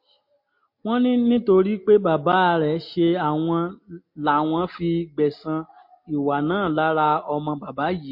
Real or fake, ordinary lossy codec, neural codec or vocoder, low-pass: real; MP3, 32 kbps; none; 5.4 kHz